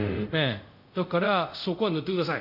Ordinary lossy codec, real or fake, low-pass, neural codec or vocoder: none; fake; 5.4 kHz; codec, 24 kHz, 0.5 kbps, DualCodec